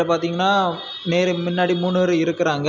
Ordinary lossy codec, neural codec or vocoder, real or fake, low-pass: none; none; real; 7.2 kHz